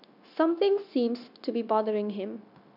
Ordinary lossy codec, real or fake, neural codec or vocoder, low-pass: none; fake; codec, 16 kHz, 0.9 kbps, LongCat-Audio-Codec; 5.4 kHz